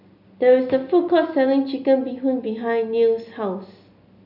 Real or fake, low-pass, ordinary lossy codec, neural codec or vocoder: real; 5.4 kHz; none; none